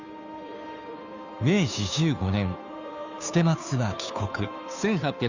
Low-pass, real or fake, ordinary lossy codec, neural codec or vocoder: 7.2 kHz; fake; none; codec, 16 kHz, 2 kbps, FunCodec, trained on Chinese and English, 25 frames a second